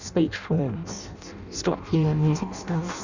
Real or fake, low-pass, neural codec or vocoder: fake; 7.2 kHz; codec, 16 kHz in and 24 kHz out, 0.6 kbps, FireRedTTS-2 codec